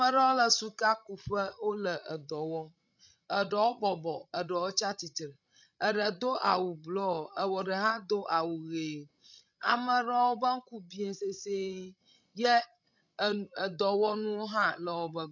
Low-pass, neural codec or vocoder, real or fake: 7.2 kHz; codec, 16 kHz, 16 kbps, FreqCodec, larger model; fake